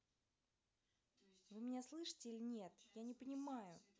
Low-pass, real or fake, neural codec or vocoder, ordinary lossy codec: none; real; none; none